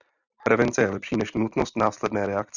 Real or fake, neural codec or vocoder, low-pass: fake; vocoder, 44.1 kHz, 128 mel bands every 256 samples, BigVGAN v2; 7.2 kHz